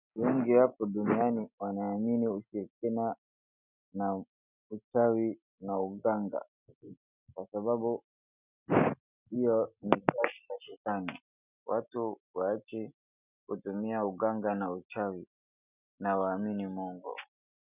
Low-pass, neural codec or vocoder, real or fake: 3.6 kHz; none; real